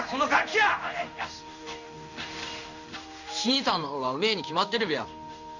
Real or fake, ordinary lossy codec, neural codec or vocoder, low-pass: fake; none; codec, 16 kHz in and 24 kHz out, 1 kbps, XY-Tokenizer; 7.2 kHz